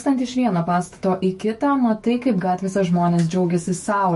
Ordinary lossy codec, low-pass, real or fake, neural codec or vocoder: MP3, 48 kbps; 14.4 kHz; fake; autoencoder, 48 kHz, 128 numbers a frame, DAC-VAE, trained on Japanese speech